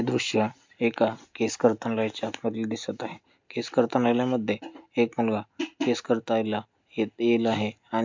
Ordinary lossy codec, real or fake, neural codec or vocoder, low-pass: MP3, 64 kbps; real; none; 7.2 kHz